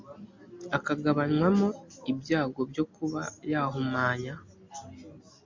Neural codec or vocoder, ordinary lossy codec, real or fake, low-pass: none; MP3, 64 kbps; real; 7.2 kHz